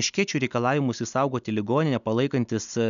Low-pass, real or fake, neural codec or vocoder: 7.2 kHz; real; none